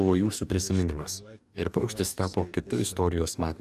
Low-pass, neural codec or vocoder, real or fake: 14.4 kHz; codec, 44.1 kHz, 2.6 kbps, DAC; fake